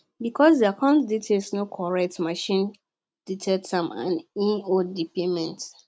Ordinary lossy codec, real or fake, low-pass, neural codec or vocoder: none; real; none; none